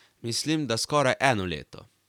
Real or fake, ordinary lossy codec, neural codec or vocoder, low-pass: fake; none; vocoder, 44.1 kHz, 128 mel bands every 256 samples, BigVGAN v2; 19.8 kHz